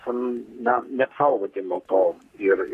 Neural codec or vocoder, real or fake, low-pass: codec, 44.1 kHz, 3.4 kbps, Pupu-Codec; fake; 14.4 kHz